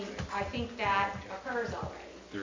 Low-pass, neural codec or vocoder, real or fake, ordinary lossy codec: 7.2 kHz; none; real; MP3, 64 kbps